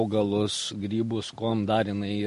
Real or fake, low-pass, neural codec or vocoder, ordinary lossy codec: real; 10.8 kHz; none; MP3, 48 kbps